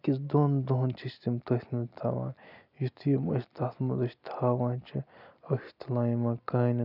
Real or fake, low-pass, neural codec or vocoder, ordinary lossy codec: real; 5.4 kHz; none; none